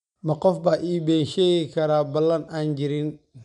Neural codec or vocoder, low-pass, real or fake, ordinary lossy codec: none; 10.8 kHz; real; none